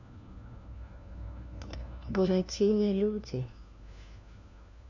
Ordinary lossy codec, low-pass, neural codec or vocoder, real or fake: none; 7.2 kHz; codec, 16 kHz, 1 kbps, FunCodec, trained on LibriTTS, 50 frames a second; fake